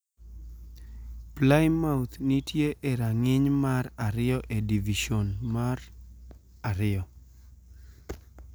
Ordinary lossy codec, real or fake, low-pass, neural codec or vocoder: none; real; none; none